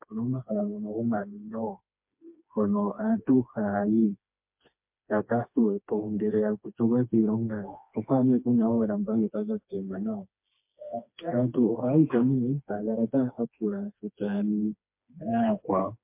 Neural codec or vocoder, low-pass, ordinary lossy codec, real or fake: codec, 16 kHz, 2 kbps, FreqCodec, smaller model; 3.6 kHz; MP3, 32 kbps; fake